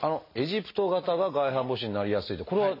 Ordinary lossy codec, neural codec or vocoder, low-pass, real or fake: MP3, 24 kbps; none; 5.4 kHz; real